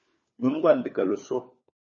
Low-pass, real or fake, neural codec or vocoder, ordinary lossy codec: 7.2 kHz; fake; codec, 16 kHz, 4 kbps, FunCodec, trained on LibriTTS, 50 frames a second; MP3, 32 kbps